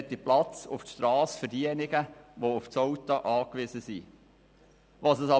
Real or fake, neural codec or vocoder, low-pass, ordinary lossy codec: real; none; none; none